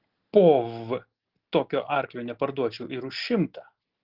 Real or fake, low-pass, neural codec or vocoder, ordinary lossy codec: real; 5.4 kHz; none; Opus, 16 kbps